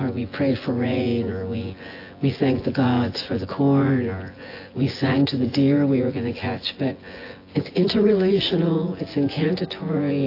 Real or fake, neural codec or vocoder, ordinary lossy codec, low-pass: fake; vocoder, 24 kHz, 100 mel bands, Vocos; AAC, 32 kbps; 5.4 kHz